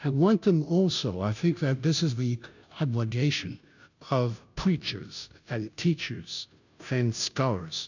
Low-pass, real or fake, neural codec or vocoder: 7.2 kHz; fake; codec, 16 kHz, 0.5 kbps, FunCodec, trained on Chinese and English, 25 frames a second